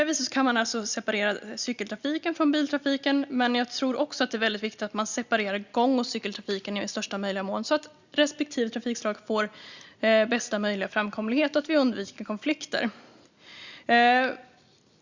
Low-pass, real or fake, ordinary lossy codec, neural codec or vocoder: 7.2 kHz; real; Opus, 64 kbps; none